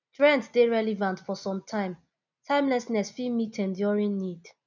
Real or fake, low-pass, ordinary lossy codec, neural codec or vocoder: real; 7.2 kHz; none; none